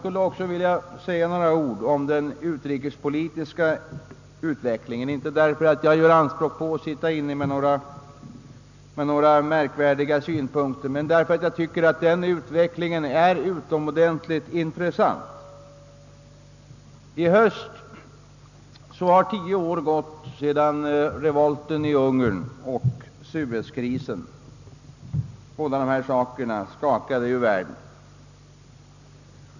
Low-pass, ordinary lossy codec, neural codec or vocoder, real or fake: 7.2 kHz; none; none; real